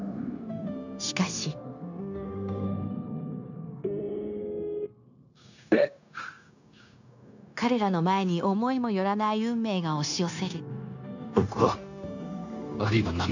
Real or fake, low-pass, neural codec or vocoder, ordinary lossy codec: fake; 7.2 kHz; codec, 16 kHz, 0.9 kbps, LongCat-Audio-Codec; none